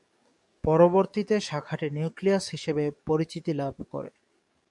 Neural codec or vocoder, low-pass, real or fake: codec, 44.1 kHz, 7.8 kbps, DAC; 10.8 kHz; fake